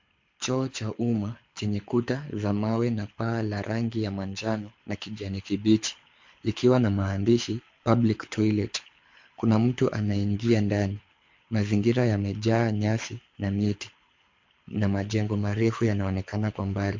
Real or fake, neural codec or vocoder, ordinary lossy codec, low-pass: fake; codec, 24 kHz, 6 kbps, HILCodec; MP3, 48 kbps; 7.2 kHz